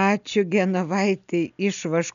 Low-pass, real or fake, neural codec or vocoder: 7.2 kHz; real; none